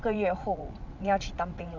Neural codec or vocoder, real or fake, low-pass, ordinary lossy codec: vocoder, 22.05 kHz, 80 mel bands, WaveNeXt; fake; 7.2 kHz; none